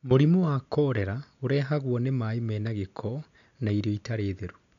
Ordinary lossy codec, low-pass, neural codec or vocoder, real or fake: none; 7.2 kHz; none; real